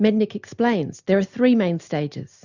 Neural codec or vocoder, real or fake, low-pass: none; real; 7.2 kHz